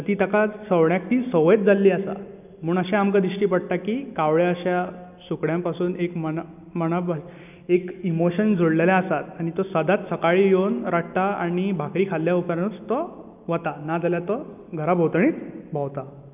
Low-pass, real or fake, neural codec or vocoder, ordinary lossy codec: 3.6 kHz; real; none; none